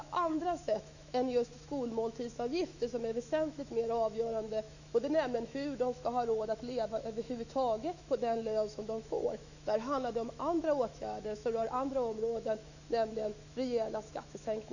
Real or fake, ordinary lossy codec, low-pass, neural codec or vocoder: fake; none; 7.2 kHz; autoencoder, 48 kHz, 128 numbers a frame, DAC-VAE, trained on Japanese speech